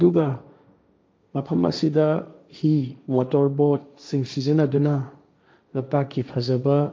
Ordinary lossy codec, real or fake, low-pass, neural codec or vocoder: none; fake; none; codec, 16 kHz, 1.1 kbps, Voila-Tokenizer